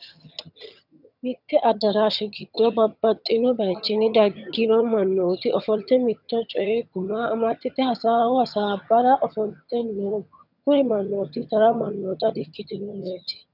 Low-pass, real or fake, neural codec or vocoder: 5.4 kHz; fake; vocoder, 22.05 kHz, 80 mel bands, HiFi-GAN